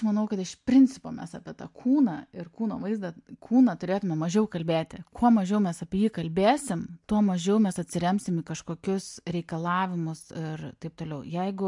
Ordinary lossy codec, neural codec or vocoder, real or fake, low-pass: MP3, 64 kbps; none; real; 10.8 kHz